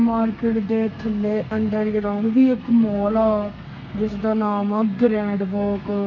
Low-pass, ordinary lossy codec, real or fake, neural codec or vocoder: 7.2 kHz; none; fake; codec, 32 kHz, 1.9 kbps, SNAC